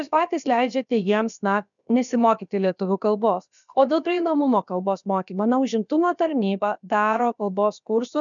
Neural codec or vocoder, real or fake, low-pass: codec, 16 kHz, about 1 kbps, DyCAST, with the encoder's durations; fake; 7.2 kHz